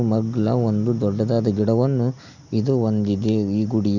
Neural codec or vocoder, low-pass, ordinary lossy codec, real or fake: none; 7.2 kHz; none; real